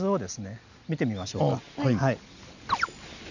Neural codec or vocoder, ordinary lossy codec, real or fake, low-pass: none; none; real; 7.2 kHz